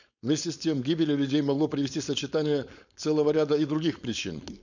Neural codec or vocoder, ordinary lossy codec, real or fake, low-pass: codec, 16 kHz, 4.8 kbps, FACodec; none; fake; 7.2 kHz